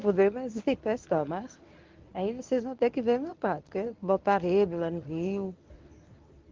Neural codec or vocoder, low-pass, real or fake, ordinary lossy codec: codec, 24 kHz, 0.9 kbps, WavTokenizer, medium speech release version 1; 7.2 kHz; fake; Opus, 32 kbps